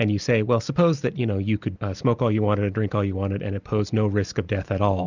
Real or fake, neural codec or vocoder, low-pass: real; none; 7.2 kHz